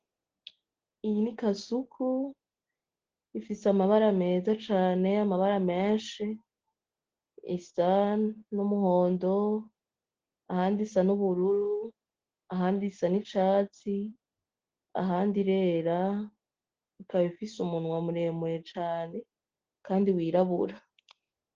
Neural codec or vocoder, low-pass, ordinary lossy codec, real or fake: none; 7.2 kHz; Opus, 16 kbps; real